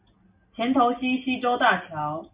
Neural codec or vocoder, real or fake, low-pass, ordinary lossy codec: none; real; 3.6 kHz; Opus, 64 kbps